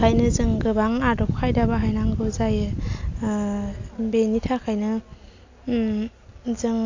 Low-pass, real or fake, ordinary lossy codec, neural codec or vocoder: 7.2 kHz; real; AAC, 48 kbps; none